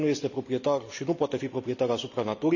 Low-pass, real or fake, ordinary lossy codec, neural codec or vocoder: 7.2 kHz; real; none; none